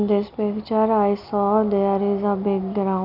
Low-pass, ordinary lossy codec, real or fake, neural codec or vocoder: 5.4 kHz; none; real; none